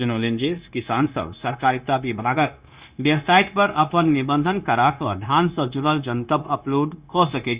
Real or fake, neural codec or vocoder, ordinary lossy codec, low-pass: fake; codec, 16 kHz, 0.9 kbps, LongCat-Audio-Codec; Opus, 64 kbps; 3.6 kHz